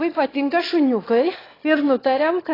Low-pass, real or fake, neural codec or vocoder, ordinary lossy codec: 5.4 kHz; fake; autoencoder, 22.05 kHz, a latent of 192 numbers a frame, VITS, trained on one speaker; AAC, 24 kbps